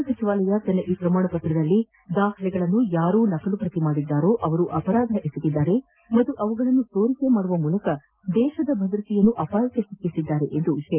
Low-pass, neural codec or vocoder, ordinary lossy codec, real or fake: 3.6 kHz; none; Opus, 24 kbps; real